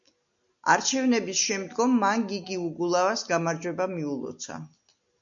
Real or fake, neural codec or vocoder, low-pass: real; none; 7.2 kHz